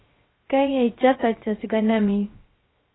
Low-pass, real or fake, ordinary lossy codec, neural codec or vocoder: 7.2 kHz; fake; AAC, 16 kbps; codec, 16 kHz, 0.3 kbps, FocalCodec